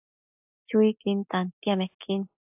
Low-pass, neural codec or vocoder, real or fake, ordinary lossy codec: 3.6 kHz; none; real; AAC, 32 kbps